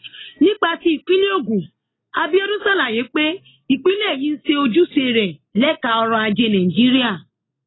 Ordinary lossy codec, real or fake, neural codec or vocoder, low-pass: AAC, 16 kbps; real; none; 7.2 kHz